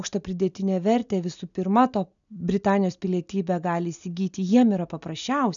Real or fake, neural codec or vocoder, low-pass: real; none; 7.2 kHz